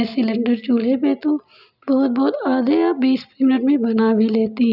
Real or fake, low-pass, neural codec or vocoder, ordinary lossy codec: real; 5.4 kHz; none; none